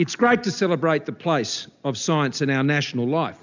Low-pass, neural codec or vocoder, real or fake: 7.2 kHz; none; real